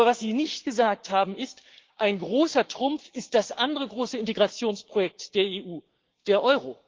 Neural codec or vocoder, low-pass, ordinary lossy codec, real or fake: codec, 16 kHz, 6 kbps, DAC; 7.2 kHz; Opus, 16 kbps; fake